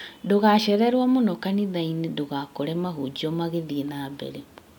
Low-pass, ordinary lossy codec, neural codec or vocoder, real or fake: 19.8 kHz; none; none; real